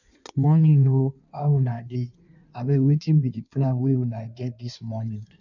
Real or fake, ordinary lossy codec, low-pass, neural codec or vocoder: fake; none; 7.2 kHz; codec, 16 kHz in and 24 kHz out, 1.1 kbps, FireRedTTS-2 codec